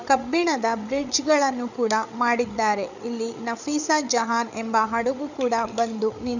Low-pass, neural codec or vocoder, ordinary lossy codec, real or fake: 7.2 kHz; codec, 16 kHz, 8 kbps, FreqCodec, larger model; none; fake